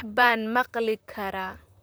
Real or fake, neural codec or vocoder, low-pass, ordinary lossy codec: fake; vocoder, 44.1 kHz, 128 mel bands, Pupu-Vocoder; none; none